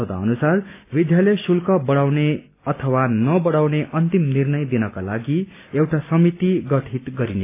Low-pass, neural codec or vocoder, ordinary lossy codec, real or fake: 3.6 kHz; none; AAC, 24 kbps; real